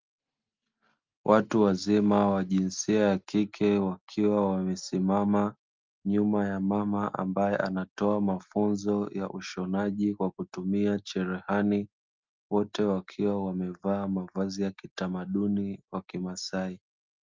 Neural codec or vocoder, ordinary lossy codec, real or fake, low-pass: none; Opus, 24 kbps; real; 7.2 kHz